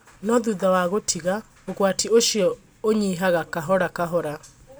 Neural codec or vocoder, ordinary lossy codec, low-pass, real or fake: none; none; none; real